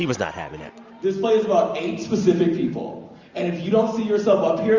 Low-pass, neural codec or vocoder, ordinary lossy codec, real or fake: 7.2 kHz; none; Opus, 64 kbps; real